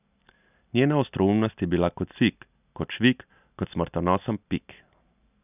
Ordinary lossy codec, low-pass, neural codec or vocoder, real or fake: none; 3.6 kHz; none; real